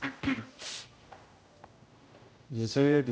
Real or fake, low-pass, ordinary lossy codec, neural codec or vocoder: fake; none; none; codec, 16 kHz, 0.5 kbps, X-Codec, HuBERT features, trained on general audio